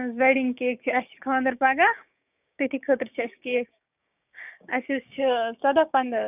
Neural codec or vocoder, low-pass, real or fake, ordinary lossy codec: codec, 16 kHz, 6 kbps, DAC; 3.6 kHz; fake; none